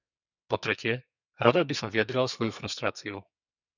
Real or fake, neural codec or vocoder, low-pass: fake; codec, 44.1 kHz, 2.6 kbps, SNAC; 7.2 kHz